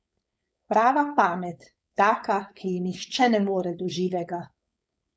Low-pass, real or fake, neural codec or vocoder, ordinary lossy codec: none; fake; codec, 16 kHz, 4.8 kbps, FACodec; none